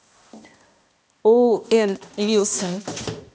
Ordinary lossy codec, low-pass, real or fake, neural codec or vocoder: none; none; fake; codec, 16 kHz, 1 kbps, X-Codec, HuBERT features, trained on balanced general audio